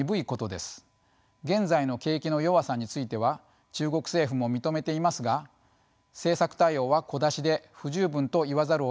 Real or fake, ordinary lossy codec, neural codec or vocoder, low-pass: real; none; none; none